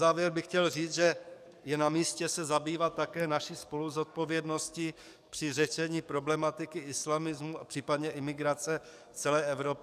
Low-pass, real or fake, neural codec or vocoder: 14.4 kHz; fake; codec, 44.1 kHz, 7.8 kbps, DAC